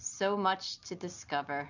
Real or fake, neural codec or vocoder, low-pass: real; none; 7.2 kHz